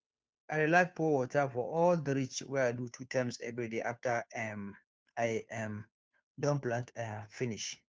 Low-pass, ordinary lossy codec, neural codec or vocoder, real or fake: none; none; codec, 16 kHz, 2 kbps, FunCodec, trained on Chinese and English, 25 frames a second; fake